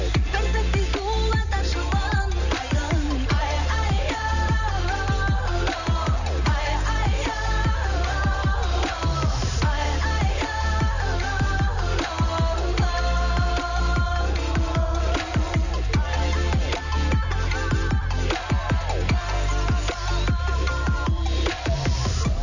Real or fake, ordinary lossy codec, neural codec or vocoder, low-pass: real; none; none; 7.2 kHz